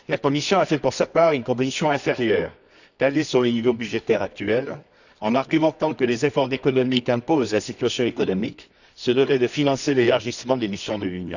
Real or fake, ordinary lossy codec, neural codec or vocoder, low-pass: fake; none; codec, 24 kHz, 0.9 kbps, WavTokenizer, medium music audio release; 7.2 kHz